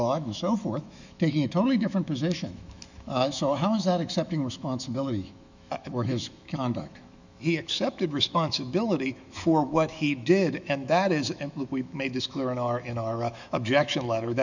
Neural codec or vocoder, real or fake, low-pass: autoencoder, 48 kHz, 128 numbers a frame, DAC-VAE, trained on Japanese speech; fake; 7.2 kHz